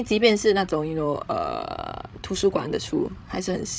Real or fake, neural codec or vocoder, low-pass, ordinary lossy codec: fake; codec, 16 kHz, 16 kbps, FreqCodec, larger model; none; none